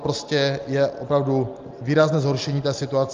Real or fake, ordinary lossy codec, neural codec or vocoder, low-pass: real; Opus, 32 kbps; none; 7.2 kHz